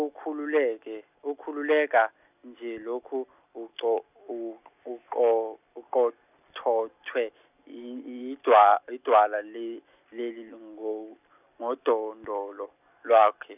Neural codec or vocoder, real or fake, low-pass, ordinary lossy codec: none; real; 3.6 kHz; none